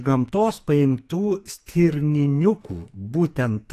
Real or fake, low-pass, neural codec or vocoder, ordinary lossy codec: fake; 14.4 kHz; codec, 44.1 kHz, 2.6 kbps, SNAC; AAC, 64 kbps